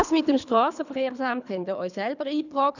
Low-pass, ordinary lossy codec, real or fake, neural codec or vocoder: 7.2 kHz; none; fake; codec, 24 kHz, 3 kbps, HILCodec